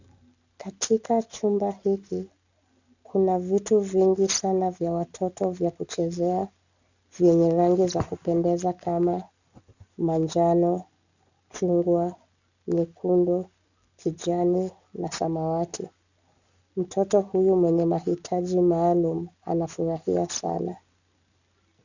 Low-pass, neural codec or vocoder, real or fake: 7.2 kHz; none; real